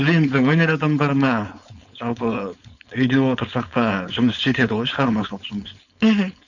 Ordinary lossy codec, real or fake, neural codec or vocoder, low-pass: none; fake; codec, 16 kHz, 4.8 kbps, FACodec; 7.2 kHz